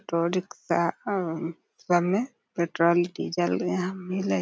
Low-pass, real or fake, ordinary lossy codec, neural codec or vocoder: none; real; none; none